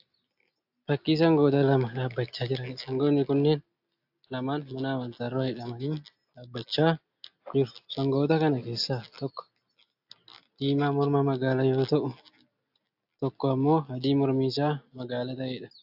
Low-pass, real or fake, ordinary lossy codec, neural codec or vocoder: 5.4 kHz; real; AAC, 48 kbps; none